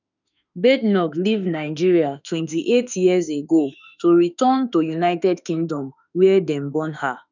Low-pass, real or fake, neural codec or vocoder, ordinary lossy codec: 7.2 kHz; fake; autoencoder, 48 kHz, 32 numbers a frame, DAC-VAE, trained on Japanese speech; none